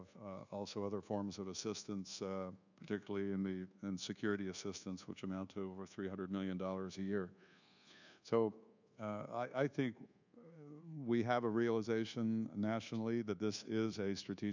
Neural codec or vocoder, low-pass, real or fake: codec, 24 kHz, 1.2 kbps, DualCodec; 7.2 kHz; fake